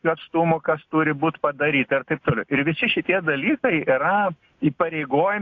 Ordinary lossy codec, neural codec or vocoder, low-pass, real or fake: AAC, 48 kbps; none; 7.2 kHz; real